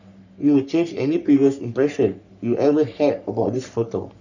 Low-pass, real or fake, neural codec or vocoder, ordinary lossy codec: 7.2 kHz; fake; codec, 44.1 kHz, 3.4 kbps, Pupu-Codec; none